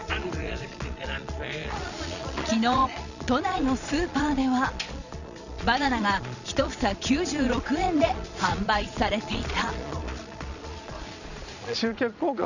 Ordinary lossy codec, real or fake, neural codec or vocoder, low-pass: none; fake; vocoder, 22.05 kHz, 80 mel bands, Vocos; 7.2 kHz